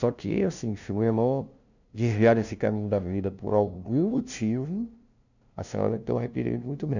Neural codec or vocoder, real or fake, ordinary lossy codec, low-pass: codec, 16 kHz, 0.5 kbps, FunCodec, trained on LibriTTS, 25 frames a second; fake; none; 7.2 kHz